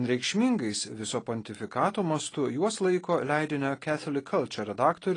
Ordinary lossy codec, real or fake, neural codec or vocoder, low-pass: AAC, 32 kbps; real; none; 9.9 kHz